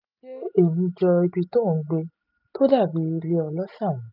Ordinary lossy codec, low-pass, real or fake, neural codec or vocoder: none; 5.4 kHz; real; none